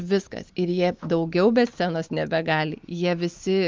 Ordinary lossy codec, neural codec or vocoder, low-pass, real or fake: Opus, 32 kbps; none; 7.2 kHz; real